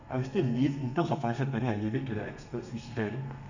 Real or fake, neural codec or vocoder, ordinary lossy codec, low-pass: fake; codec, 32 kHz, 1.9 kbps, SNAC; none; 7.2 kHz